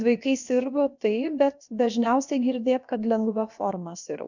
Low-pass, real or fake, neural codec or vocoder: 7.2 kHz; fake; codec, 16 kHz, about 1 kbps, DyCAST, with the encoder's durations